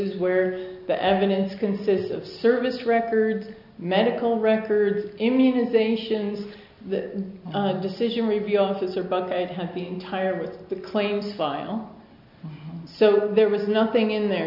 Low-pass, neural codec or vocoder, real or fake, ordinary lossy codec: 5.4 kHz; none; real; MP3, 48 kbps